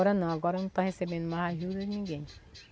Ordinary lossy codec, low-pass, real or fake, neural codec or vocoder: none; none; real; none